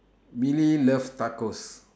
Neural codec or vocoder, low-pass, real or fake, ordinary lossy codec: none; none; real; none